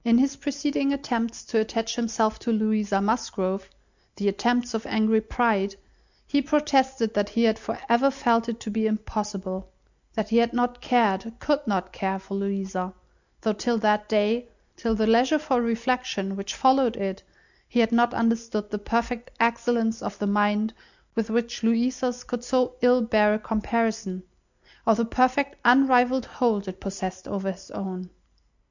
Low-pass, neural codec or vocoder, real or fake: 7.2 kHz; none; real